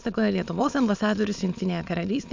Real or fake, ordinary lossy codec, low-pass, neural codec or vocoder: fake; MP3, 64 kbps; 7.2 kHz; autoencoder, 22.05 kHz, a latent of 192 numbers a frame, VITS, trained on many speakers